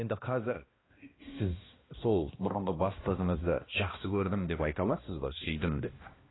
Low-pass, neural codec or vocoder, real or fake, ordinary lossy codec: 7.2 kHz; codec, 16 kHz, 1 kbps, X-Codec, HuBERT features, trained on balanced general audio; fake; AAC, 16 kbps